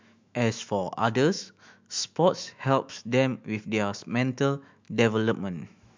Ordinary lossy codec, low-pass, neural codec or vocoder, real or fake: MP3, 64 kbps; 7.2 kHz; autoencoder, 48 kHz, 128 numbers a frame, DAC-VAE, trained on Japanese speech; fake